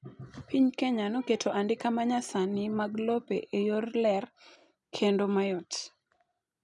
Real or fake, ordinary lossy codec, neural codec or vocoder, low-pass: real; none; none; 10.8 kHz